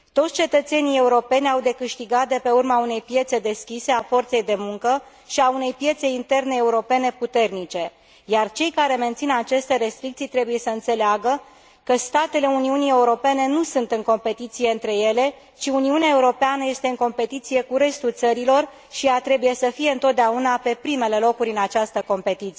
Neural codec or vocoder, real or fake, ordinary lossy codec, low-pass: none; real; none; none